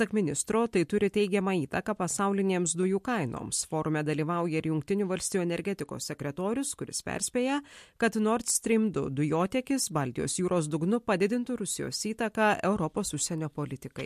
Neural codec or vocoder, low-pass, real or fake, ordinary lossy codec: none; 14.4 kHz; real; MP3, 64 kbps